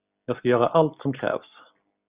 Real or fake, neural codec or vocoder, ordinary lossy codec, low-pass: real; none; Opus, 64 kbps; 3.6 kHz